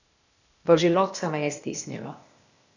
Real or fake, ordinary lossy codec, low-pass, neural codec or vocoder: fake; none; 7.2 kHz; codec, 16 kHz, 0.8 kbps, ZipCodec